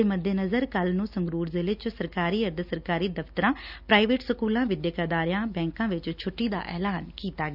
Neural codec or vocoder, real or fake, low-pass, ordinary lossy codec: none; real; 5.4 kHz; none